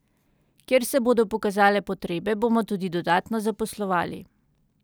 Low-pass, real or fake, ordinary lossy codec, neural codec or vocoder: none; real; none; none